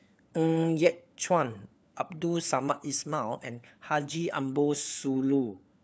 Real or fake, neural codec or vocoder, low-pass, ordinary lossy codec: fake; codec, 16 kHz, 4 kbps, FunCodec, trained on LibriTTS, 50 frames a second; none; none